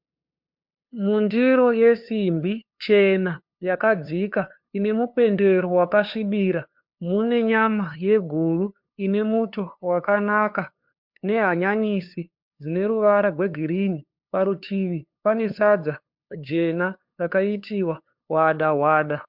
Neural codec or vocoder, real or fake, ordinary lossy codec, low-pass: codec, 16 kHz, 2 kbps, FunCodec, trained on LibriTTS, 25 frames a second; fake; MP3, 48 kbps; 5.4 kHz